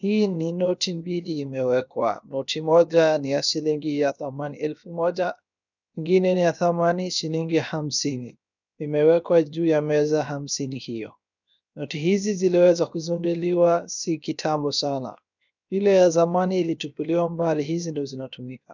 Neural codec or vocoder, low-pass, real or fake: codec, 16 kHz, 0.7 kbps, FocalCodec; 7.2 kHz; fake